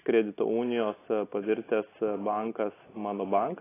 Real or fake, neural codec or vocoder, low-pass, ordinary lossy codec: real; none; 3.6 kHz; AAC, 16 kbps